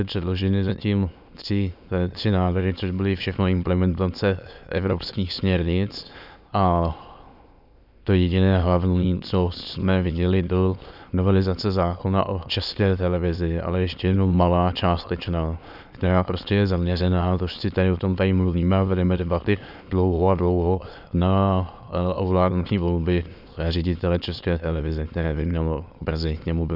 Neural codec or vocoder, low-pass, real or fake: autoencoder, 22.05 kHz, a latent of 192 numbers a frame, VITS, trained on many speakers; 5.4 kHz; fake